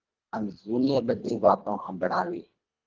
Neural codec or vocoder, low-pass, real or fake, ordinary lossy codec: codec, 24 kHz, 1.5 kbps, HILCodec; 7.2 kHz; fake; Opus, 24 kbps